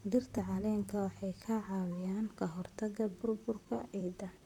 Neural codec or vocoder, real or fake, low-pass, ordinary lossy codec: vocoder, 44.1 kHz, 128 mel bands, Pupu-Vocoder; fake; 19.8 kHz; none